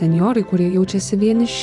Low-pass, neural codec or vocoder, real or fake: 10.8 kHz; vocoder, 44.1 kHz, 128 mel bands every 512 samples, BigVGAN v2; fake